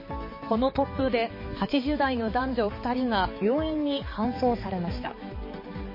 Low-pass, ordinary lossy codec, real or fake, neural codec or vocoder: 5.4 kHz; MP3, 24 kbps; fake; codec, 16 kHz, 4 kbps, X-Codec, HuBERT features, trained on balanced general audio